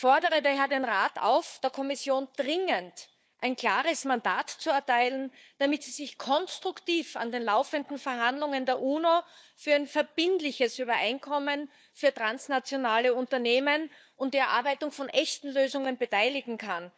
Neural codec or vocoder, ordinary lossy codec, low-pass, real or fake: codec, 16 kHz, 4 kbps, FunCodec, trained on Chinese and English, 50 frames a second; none; none; fake